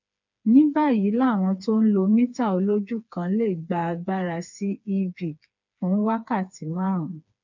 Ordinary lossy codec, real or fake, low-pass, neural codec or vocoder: none; fake; 7.2 kHz; codec, 16 kHz, 4 kbps, FreqCodec, smaller model